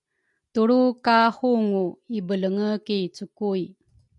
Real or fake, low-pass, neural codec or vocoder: real; 10.8 kHz; none